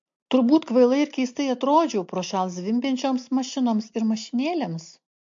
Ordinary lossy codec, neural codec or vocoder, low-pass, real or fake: MP3, 48 kbps; none; 7.2 kHz; real